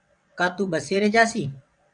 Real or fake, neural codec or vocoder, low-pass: fake; vocoder, 22.05 kHz, 80 mel bands, WaveNeXt; 9.9 kHz